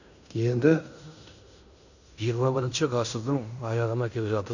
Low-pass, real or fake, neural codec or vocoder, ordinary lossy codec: 7.2 kHz; fake; codec, 16 kHz in and 24 kHz out, 0.9 kbps, LongCat-Audio-Codec, fine tuned four codebook decoder; none